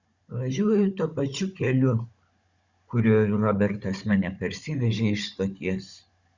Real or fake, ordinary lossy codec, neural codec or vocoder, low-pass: fake; Opus, 64 kbps; codec, 16 kHz, 16 kbps, FunCodec, trained on Chinese and English, 50 frames a second; 7.2 kHz